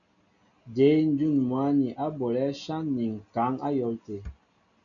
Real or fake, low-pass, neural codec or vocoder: real; 7.2 kHz; none